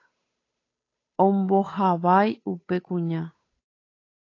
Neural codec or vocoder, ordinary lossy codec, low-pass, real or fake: codec, 16 kHz, 8 kbps, FunCodec, trained on Chinese and English, 25 frames a second; AAC, 32 kbps; 7.2 kHz; fake